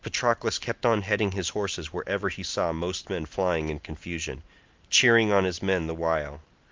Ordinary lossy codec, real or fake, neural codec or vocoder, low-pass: Opus, 24 kbps; real; none; 7.2 kHz